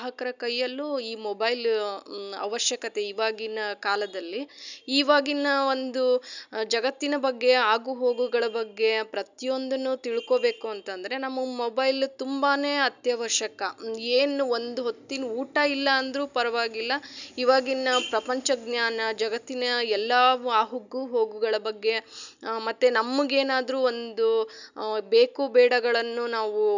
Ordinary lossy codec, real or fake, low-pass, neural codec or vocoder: none; real; 7.2 kHz; none